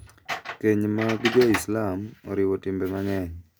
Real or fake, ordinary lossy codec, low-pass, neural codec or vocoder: real; none; none; none